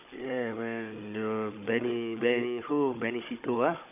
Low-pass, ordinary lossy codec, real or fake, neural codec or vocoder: 3.6 kHz; none; fake; codec, 16 kHz, 16 kbps, FunCodec, trained on Chinese and English, 50 frames a second